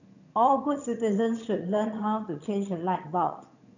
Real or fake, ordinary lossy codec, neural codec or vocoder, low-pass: fake; none; vocoder, 22.05 kHz, 80 mel bands, HiFi-GAN; 7.2 kHz